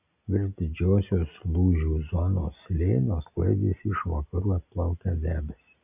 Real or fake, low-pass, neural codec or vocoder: real; 3.6 kHz; none